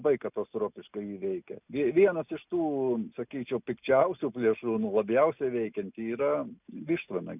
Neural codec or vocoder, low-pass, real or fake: none; 3.6 kHz; real